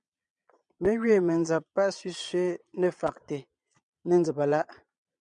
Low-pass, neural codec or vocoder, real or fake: 9.9 kHz; vocoder, 22.05 kHz, 80 mel bands, Vocos; fake